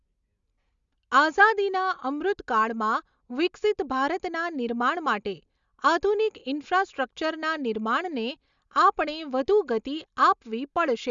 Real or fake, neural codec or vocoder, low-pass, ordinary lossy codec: real; none; 7.2 kHz; Opus, 64 kbps